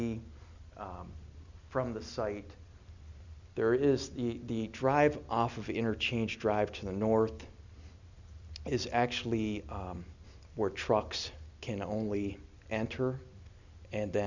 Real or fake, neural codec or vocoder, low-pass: real; none; 7.2 kHz